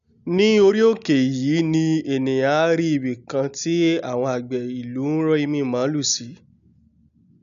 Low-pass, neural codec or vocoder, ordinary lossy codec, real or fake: 7.2 kHz; none; none; real